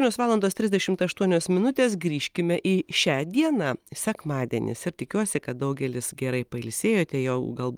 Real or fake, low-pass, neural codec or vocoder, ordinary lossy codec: real; 19.8 kHz; none; Opus, 32 kbps